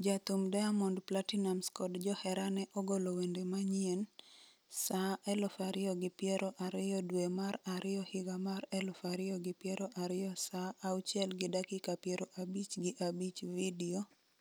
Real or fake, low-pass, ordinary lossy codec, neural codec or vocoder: real; none; none; none